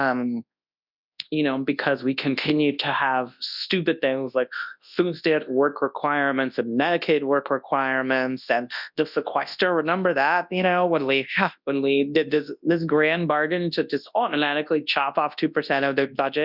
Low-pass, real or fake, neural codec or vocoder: 5.4 kHz; fake; codec, 24 kHz, 0.9 kbps, WavTokenizer, large speech release